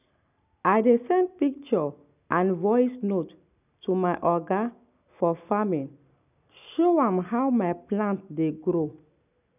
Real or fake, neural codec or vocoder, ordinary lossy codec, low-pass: real; none; none; 3.6 kHz